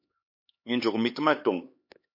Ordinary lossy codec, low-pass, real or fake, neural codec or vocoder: MP3, 32 kbps; 5.4 kHz; fake; codec, 16 kHz, 4 kbps, X-Codec, HuBERT features, trained on LibriSpeech